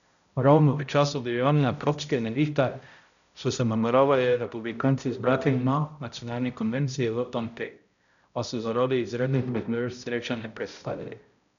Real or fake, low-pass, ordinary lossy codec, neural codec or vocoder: fake; 7.2 kHz; MP3, 96 kbps; codec, 16 kHz, 0.5 kbps, X-Codec, HuBERT features, trained on balanced general audio